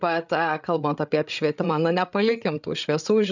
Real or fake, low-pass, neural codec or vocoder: fake; 7.2 kHz; codec, 16 kHz, 8 kbps, FreqCodec, larger model